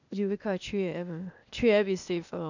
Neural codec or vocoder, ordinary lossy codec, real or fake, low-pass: codec, 16 kHz, 0.8 kbps, ZipCodec; none; fake; 7.2 kHz